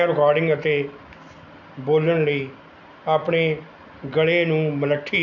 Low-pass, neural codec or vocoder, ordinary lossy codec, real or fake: 7.2 kHz; none; none; real